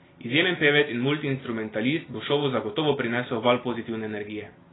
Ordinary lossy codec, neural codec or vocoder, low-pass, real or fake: AAC, 16 kbps; none; 7.2 kHz; real